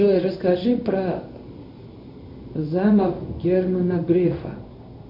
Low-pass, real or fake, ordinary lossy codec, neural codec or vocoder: 5.4 kHz; fake; MP3, 32 kbps; codec, 16 kHz in and 24 kHz out, 1 kbps, XY-Tokenizer